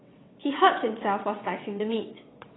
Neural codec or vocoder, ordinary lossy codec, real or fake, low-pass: vocoder, 22.05 kHz, 80 mel bands, WaveNeXt; AAC, 16 kbps; fake; 7.2 kHz